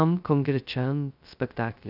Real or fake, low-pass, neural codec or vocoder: fake; 5.4 kHz; codec, 16 kHz, 0.2 kbps, FocalCodec